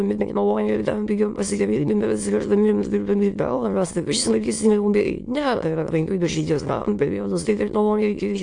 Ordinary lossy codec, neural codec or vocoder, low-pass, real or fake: AAC, 64 kbps; autoencoder, 22.05 kHz, a latent of 192 numbers a frame, VITS, trained on many speakers; 9.9 kHz; fake